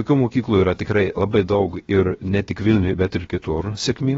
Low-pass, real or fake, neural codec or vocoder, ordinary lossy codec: 7.2 kHz; fake; codec, 16 kHz, 0.7 kbps, FocalCodec; AAC, 24 kbps